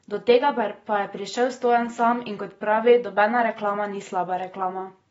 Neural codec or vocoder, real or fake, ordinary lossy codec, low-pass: none; real; AAC, 24 kbps; 19.8 kHz